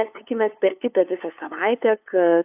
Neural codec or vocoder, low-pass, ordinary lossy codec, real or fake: codec, 16 kHz, 2 kbps, FunCodec, trained on LibriTTS, 25 frames a second; 3.6 kHz; AAC, 32 kbps; fake